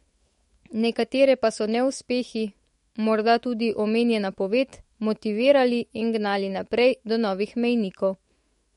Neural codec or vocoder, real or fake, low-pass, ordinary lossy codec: autoencoder, 48 kHz, 128 numbers a frame, DAC-VAE, trained on Japanese speech; fake; 19.8 kHz; MP3, 48 kbps